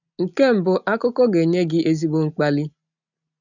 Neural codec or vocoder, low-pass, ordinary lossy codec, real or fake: none; 7.2 kHz; none; real